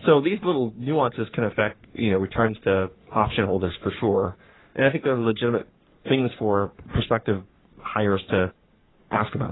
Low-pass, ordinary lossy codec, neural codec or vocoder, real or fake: 7.2 kHz; AAC, 16 kbps; codec, 44.1 kHz, 3.4 kbps, Pupu-Codec; fake